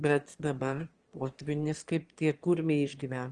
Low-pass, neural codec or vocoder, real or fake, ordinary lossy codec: 9.9 kHz; autoencoder, 22.05 kHz, a latent of 192 numbers a frame, VITS, trained on one speaker; fake; Opus, 24 kbps